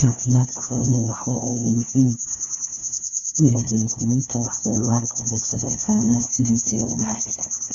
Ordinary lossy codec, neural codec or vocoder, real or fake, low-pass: none; codec, 16 kHz, 1 kbps, FunCodec, trained on LibriTTS, 50 frames a second; fake; 7.2 kHz